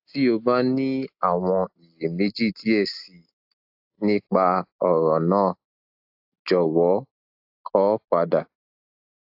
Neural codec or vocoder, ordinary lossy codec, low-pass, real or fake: none; AAC, 48 kbps; 5.4 kHz; real